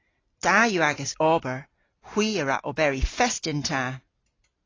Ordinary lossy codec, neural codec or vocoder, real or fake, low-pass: AAC, 32 kbps; none; real; 7.2 kHz